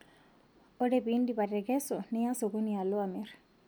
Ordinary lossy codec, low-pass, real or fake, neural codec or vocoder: none; none; real; none